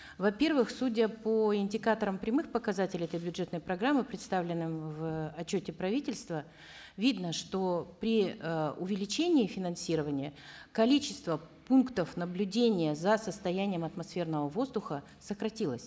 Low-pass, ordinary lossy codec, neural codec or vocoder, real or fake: none; none; none; real